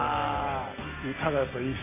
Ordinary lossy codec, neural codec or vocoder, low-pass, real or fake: AAC, 16 kbps; none; 3.6 kHz; real